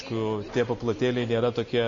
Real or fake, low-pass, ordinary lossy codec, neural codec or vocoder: real; 7.2 kHz; MP3, 32 kbps; none